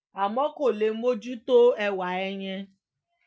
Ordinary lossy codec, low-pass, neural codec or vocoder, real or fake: none; none; none; real